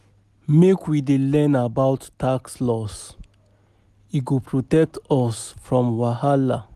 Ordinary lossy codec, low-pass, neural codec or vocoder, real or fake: none; 14.4 kHz; none; real